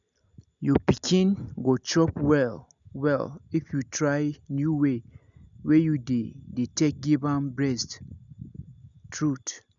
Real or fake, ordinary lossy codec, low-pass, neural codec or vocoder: real; none; 7.2 kHz; none